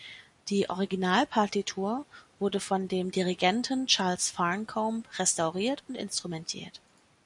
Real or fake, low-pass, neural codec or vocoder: real; 10.8 kHz; none